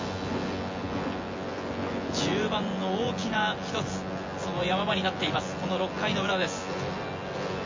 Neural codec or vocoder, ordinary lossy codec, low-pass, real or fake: vocoder, 24 kHz, 100 mel bands, Vocos; MP3, 32 kbps; 7.2 kHz; fake